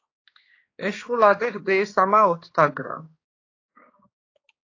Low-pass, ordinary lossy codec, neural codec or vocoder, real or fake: 7.2 kHz; AAC, 32 kbps; codec, 16 kHz, 2 kbps, X-Codec, HuBERT features, trained on balanced general audio; fake